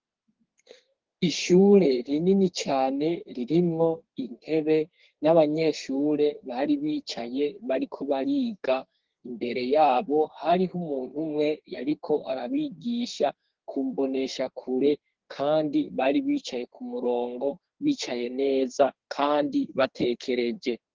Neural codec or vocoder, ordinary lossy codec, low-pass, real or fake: codec, 32 kHz, 1.9 kbps, SNAC; Opus, 16 kbps; 7.2 kHz; fake